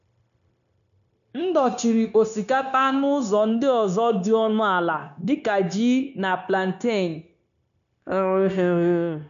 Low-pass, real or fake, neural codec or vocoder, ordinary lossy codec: 7.2 kHz; fake; codec, 16 kHz, 0.9 kbps, LongCat-Audio-Codec; none